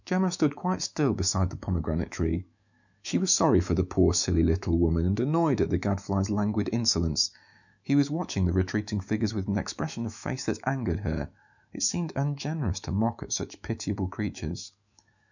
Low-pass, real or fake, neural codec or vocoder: 7.2 kHz; fake; autoencoder, 48 kHz, 128 numbers a frame, DAC-VAE, trained on Japanese speech